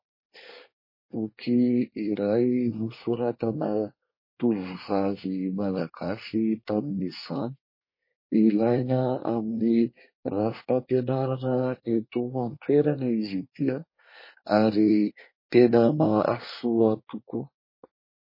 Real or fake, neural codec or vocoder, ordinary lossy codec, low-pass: fake; codec, 24 kHz, 1 kbps, SNAC; MP3, 24 kbps; 5.4 kHz